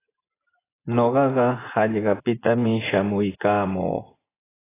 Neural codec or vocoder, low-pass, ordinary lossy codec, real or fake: vocoder, 24 kHz, 100 mel bands, Vocos; 3.6 kHz; AAC, 16 kbps; fake